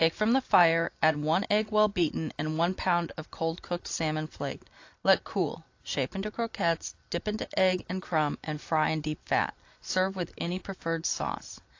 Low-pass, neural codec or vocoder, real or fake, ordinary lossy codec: 7.2 kHz; none; real; AAC, 48 kbps